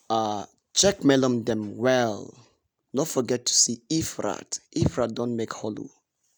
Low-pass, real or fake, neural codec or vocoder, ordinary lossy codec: none; real; none; none